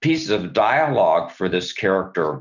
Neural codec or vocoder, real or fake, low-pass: none; real; 7.2 kHz